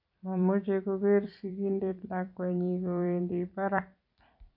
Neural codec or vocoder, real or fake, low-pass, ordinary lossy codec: vocoder, 44.1 kHz, 128 mel bands every 256 samples, BigVGAN v2; fake; 5.4 kHz; AAC, 48 kbps